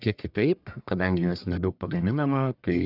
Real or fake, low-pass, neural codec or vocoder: fake; 5.4 kHz; codec, 44.1 kHz, 1.7 kbps, Pupu-Codec